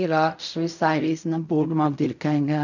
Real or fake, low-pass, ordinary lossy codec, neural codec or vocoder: fake; 7.2 kHz; none; codec, 16 kHz in and 24 kHz out, 0.4 kbps, LongCat-Audio-Codec, fine tuned four codebook decoder